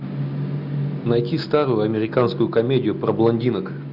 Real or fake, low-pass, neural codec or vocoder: real; 5.4 kHz; none